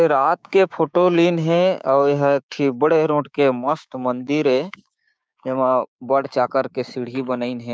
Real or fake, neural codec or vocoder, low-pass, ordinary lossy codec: fake; codec, 16 kHz, 6 kbps, DAC; none; none